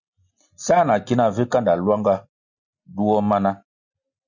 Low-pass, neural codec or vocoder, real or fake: 7.2 kHz; none; real